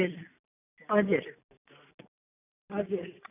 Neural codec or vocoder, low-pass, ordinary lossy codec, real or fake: none; 3.6 kHz; none; real